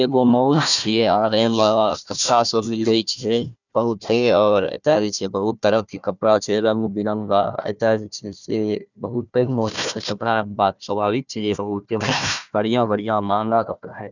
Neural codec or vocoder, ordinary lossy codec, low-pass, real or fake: codec, 16 kHz, 1 kbps, FunCodec, trained on Chinese and English, 50 frames a second; none; 7.2 kHz; fake